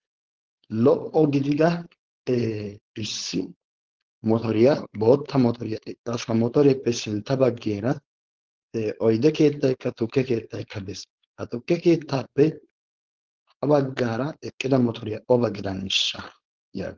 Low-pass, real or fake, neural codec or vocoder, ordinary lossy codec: 7.2 kHz; fake; codec, 16 kHz, 4.8 kbps, FACodec; Opus, 16 kbps